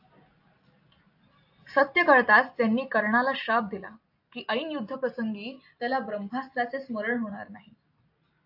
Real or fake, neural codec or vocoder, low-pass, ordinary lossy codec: real; none; 5.4 kHz; AAC, 48 kbps